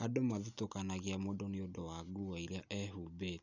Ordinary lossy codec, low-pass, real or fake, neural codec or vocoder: none; 7.2 kHz; real; none